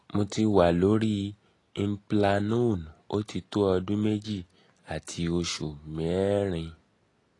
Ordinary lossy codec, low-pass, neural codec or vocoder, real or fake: AAC, 32 kbps; 10.8 kHz; none; real